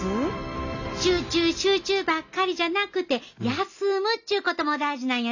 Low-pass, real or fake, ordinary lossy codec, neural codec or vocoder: 7.2 kHz; real; none; none